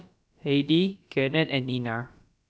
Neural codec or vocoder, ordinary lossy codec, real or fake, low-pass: codec, 16 kHz, about 1 kbps, DyCAST, with the encoder's durations; none; fake; none